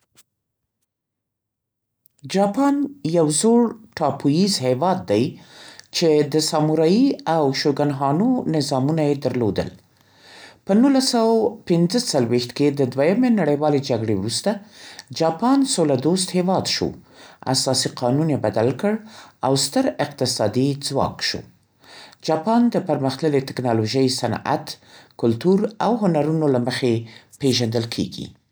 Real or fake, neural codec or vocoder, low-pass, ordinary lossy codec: real; none; none; none